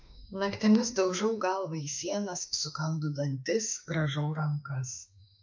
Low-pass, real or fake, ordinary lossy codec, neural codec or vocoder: 7.2 kHz; fake; MP3, 64 kbps; autoencoder, 48 kHz, 32 numbers a frame, DAC-VAE, trained on Japanese speech